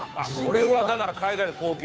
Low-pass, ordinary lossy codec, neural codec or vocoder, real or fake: none; none; codec, 16 kHz, 2 kbps, FunCodec, trained on Chinese and English, 25 frames a second; fake